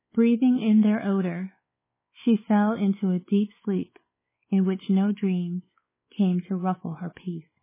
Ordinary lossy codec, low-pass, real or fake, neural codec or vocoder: MP3, 16 kbps; 3.6 kHz; fake; codec, 16 kHz, 4 kbps, X-Codec, WavLM features, trained on Multilingual LibriSpeech